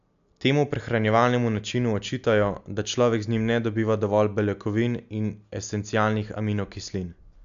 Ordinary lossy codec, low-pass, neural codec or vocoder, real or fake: AAC, 96 kbps; 7.2 kHz; none; real